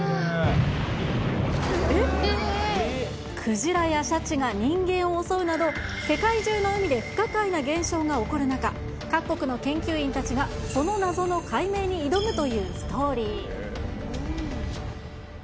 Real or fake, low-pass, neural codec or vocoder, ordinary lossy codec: real; none; none; none